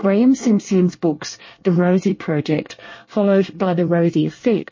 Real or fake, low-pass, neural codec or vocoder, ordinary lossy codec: fake; 7.2 kHz; codec, 24 kHz, 1 kbps, SNAC; MP3, 32 kbps